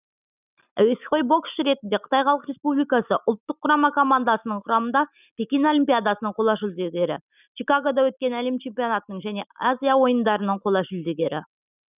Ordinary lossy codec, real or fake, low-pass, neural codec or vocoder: none; real; 3.6 kHz; none